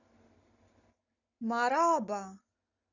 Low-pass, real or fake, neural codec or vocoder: 7.2 kHz; real; none